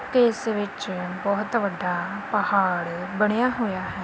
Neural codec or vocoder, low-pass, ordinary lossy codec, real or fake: none; none; none; real